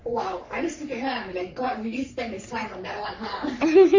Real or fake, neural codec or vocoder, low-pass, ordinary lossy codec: fake; codec, 44.1 kHz, 3.4 kbps, Pupu-Codec; 7.2 kHz; MP3, 64 kbps